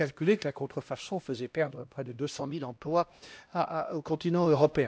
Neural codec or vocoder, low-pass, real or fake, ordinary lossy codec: codec, 16 kHz, 1 kbps, X-Codec, HuBERT features, trained on LibriSpeech; none; fake; none